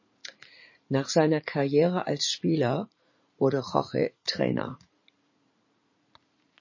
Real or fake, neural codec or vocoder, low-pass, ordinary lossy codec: fake; vocoder, 44.1 kHz, 80 mel bands, Vocos; 7.2 kHz; MP3, 32 kbps